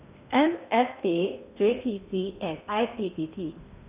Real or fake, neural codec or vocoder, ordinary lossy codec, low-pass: fake; codec, 16 kHz in and 24 kHz out, 0.8 kbps, FocalCodec, streaming, 65536 codes; Opus, 64 kbps; 3.6 kHz